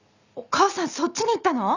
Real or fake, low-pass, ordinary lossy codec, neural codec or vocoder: real; 7.2 kHz; none; none